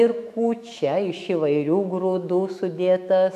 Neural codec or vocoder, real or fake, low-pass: autoencoder, 48 kHz, 128 numbers a frame, DAC-VAE, trained on Japanese speech; fake; 14.4 kHz